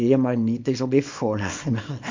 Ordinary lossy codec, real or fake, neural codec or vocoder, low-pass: MP3, 48 kbps; fake; codec, 24 kHz, 0.9 kbps, WavTokenizer, small release; 7.2 kHz